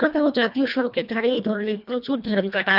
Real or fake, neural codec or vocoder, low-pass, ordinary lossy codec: fake; codec, 24 kHz, 1.5 kbps, HILCodec; 5.4 kHz; none